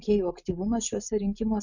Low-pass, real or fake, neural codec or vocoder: 7.2 kHz; real; none